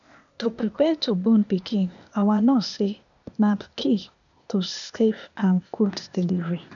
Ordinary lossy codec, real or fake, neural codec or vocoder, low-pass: none; fake; codec, 16 kHz, 0.8 kbps, ZipCodec; 7.2 kHz